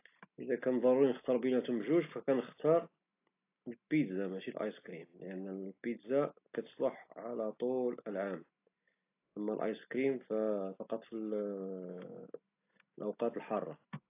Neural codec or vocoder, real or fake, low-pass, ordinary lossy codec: none; real; 3.6 kHz; AAC, 24 kbps